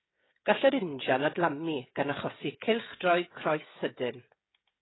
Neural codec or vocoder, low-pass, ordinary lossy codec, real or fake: codec, 16 kHz, 16 kbps, FreqCodec, smaller model; 7.2 kHz; AAC, 16 kbps; fake